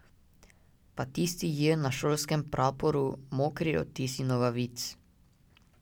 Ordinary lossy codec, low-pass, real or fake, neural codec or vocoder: none; 19.8 kHz; real; none